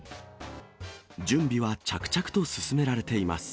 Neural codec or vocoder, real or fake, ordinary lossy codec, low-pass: none; real; none; none